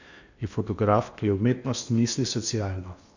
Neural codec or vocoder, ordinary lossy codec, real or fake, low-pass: codec, 16 kHz in and 24 kHz out, 0.8 kbps, FocalCodec, streaming, 65536 codes; none; fake; 7.2 kHz